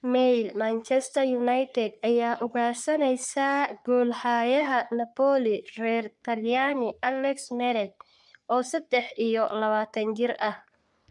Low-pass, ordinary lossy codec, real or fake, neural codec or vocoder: 10.8 kHz; none; fake; codec, 44.1 kHz, 3.4 kbps, Pupu-Codec